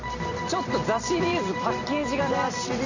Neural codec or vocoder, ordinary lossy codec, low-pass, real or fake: vocoder, 44.1 kHz, 128 mel bands every 256 samples, BigVGAN v2; none; 7.2 kHz; fake